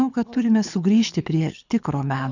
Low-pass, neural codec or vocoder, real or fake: 7.2 kHz; codec, 24 kHz, 6 kbps, HILCodec; fake